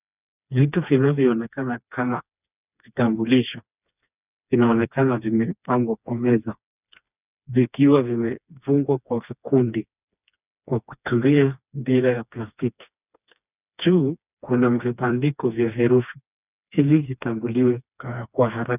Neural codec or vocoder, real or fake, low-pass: codec, 16 kHz, 2 kbps, FreqCodec, smaller model; fake; 3.6 kHz